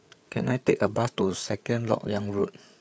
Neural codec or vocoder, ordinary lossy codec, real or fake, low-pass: codec, 16 kHz, 8 kbps, FreqCodec, larger model; none; fake; none